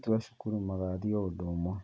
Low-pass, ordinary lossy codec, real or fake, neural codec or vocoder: none; none; real; none